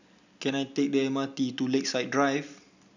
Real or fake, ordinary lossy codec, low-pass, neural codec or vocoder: real; none; 7.2 kHz; none